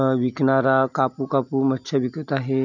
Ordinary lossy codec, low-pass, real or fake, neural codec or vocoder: none; 7.2 kHz; real; none